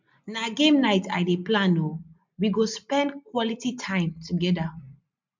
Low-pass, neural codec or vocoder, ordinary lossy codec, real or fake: 7.2 kHz; none; MP3, 64 kbps; real